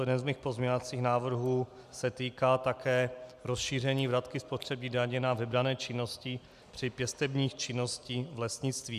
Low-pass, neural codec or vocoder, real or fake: 14.4 kHz; none; real